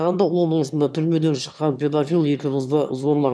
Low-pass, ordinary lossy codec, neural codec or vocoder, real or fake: none; none; autoencoder, 22.05 kHz, a latent of 192 numbers a frame, VITS, trained on one speaker; fake